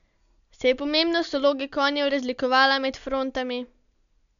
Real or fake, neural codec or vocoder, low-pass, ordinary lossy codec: real; none; 7.2 kHz; none